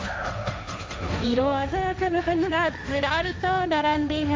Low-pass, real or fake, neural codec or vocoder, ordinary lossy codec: none; fake; codec, 16 kHz, 1.1 kbps, Voila-Tokenizer; none